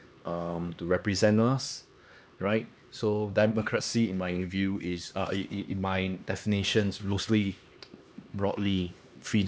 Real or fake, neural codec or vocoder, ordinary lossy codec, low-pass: fake; codec, 16 kHz, 2 kbps, X-Codec, HuBERT features, trained on LibriSpeech; none; none